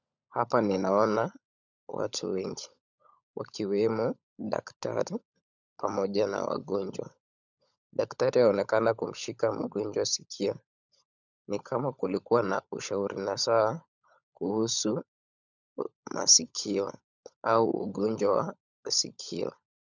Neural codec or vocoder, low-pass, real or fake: codec, 16 kHz, 16 kbps, FunCodec, trained on LibriTTS, 50 frames a second; 7.2 kHz; fake